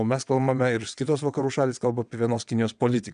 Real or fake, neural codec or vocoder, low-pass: fake; vocoder, 22.05 kHz, 80 mel bands, WaveNeXt; 9.9 kHz